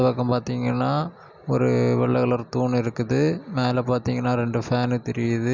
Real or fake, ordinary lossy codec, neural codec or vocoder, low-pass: real; Opus, 64 kbps; none; 7.2 kHz